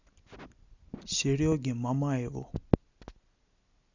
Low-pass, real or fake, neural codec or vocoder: 7.2 kHz; real; none